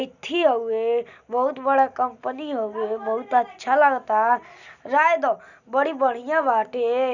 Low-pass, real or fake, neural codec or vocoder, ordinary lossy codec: 7.2 kHz; real; none; none